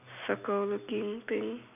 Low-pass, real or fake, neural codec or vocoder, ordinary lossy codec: 3.6 kHz; real; none; none